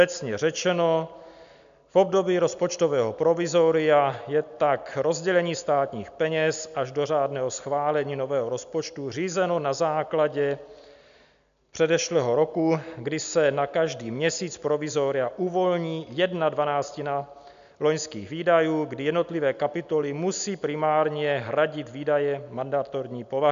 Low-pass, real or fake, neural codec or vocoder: 7.2 kHz; real; none